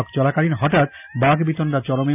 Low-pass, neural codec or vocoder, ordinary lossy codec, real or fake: 3.6 kHz; none; none; real